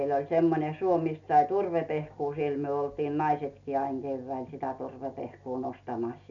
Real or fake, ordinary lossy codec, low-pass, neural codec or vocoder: real; none; 7.2 kHz; none